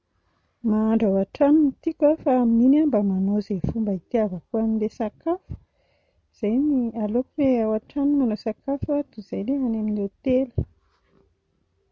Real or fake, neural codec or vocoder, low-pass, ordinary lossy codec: real; none; none; none